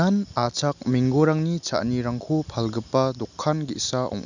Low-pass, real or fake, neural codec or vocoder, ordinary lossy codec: 7.2 kHz; real; none; none